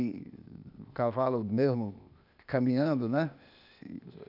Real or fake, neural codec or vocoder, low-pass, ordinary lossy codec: fake; codec, 16 kHz, 0.8 kbps, ZipCodec; 5.4 kHz; none